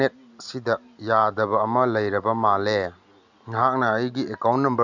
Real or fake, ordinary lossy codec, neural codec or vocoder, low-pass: real; none; none; 7.2 kHz